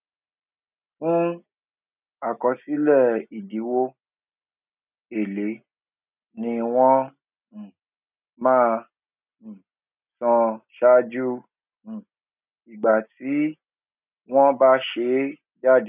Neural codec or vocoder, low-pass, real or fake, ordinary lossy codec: none; 3.6 kHz; real; none